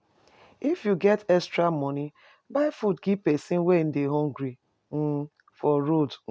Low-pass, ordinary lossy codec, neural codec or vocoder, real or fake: none; none; none; real